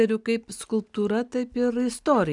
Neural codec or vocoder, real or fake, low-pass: none; real; 10.8 kHz